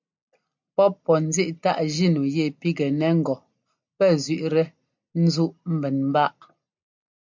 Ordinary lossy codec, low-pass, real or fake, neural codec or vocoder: MP3, 64 kbps; 7.2 kHz; real; none